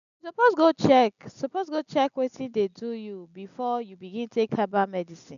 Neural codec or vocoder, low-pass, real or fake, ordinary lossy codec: none; 7.2 kHz; real; none